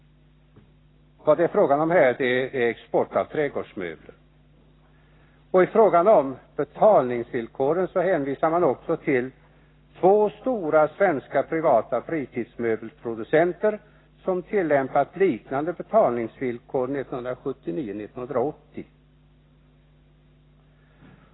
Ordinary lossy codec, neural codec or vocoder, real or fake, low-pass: AAC, 16 kbps; none; real; 7.2 kHz